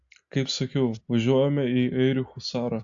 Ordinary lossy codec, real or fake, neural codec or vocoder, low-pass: AAC, 64 kbps; real; none; 7.2 kHz